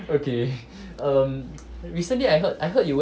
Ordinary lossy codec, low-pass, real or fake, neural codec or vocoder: none; none; real; none